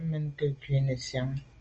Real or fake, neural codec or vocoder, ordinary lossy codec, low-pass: real; none; Opus, 24 kbps; 7.2 kHz